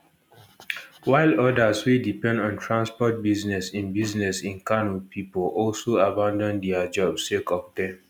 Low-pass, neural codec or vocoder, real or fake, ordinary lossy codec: none; none; real; none